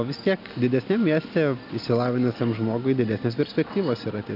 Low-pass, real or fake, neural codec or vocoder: 5.4 kHz; real; none